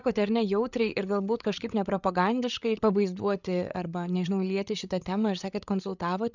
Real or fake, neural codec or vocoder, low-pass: fake; codec, 16 kHz, 8 kbps, FreqCodec, larger model; 7.2 kHz